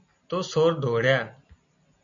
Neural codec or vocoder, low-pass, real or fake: none; 7.2 kHz; real